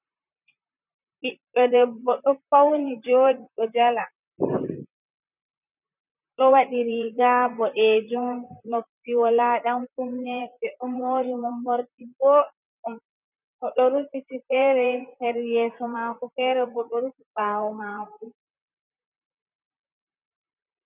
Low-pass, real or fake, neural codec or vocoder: 3.6 kHz; fake; vocoder, 44.1 kHz, 128 mel bands, Pupu-Vocoder